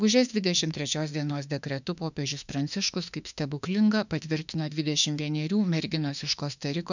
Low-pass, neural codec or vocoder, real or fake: 7.2 kHz; autoencoder, 48 kHz, 32 numbers a frame, DAC-VAE, trained on Japanese speech; fake